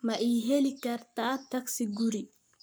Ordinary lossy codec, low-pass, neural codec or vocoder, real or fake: none; none; codec, 44.1 kHz, 7.8 kbps, Pupu-Codec; fake